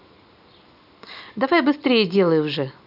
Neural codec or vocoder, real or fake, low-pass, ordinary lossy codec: none; real; 5.4 kHz; none